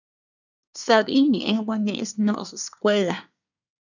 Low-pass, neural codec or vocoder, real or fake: 7.2 kHz; codec, 24 kHz, 1 kbps, SNAC; fake